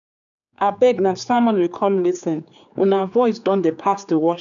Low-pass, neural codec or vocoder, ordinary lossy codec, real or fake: 7.2 kHz; codec, 16 kHz, 4 kbps, X-Codec, HuBERT features, trained on general audio; none; fake